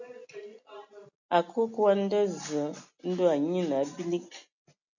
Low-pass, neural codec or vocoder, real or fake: 7.2 kHz; none; real